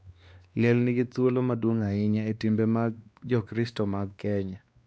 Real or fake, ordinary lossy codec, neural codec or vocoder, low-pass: fake; none; codec, 16 kHz, 2 kbps, X-Codec, WavLM features, trained on Multilingual LibriSpeech; none